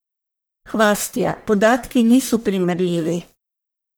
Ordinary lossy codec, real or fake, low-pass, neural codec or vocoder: none; fake; none; codec, 44.1 kHz, 1.7 kbps, Pupu-Codec